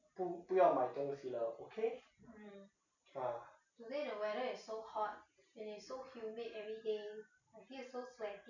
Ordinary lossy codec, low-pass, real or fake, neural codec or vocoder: none; 7.2 kHz; real; none